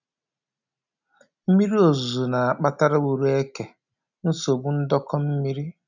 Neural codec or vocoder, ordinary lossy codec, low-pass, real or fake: none; none; 7.2 kHz; real